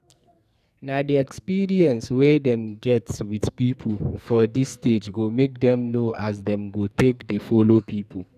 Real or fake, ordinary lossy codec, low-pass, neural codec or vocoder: fake; none; 14.4 kHz; codec, 44.1 kHz, 2.6 kbps, SNAC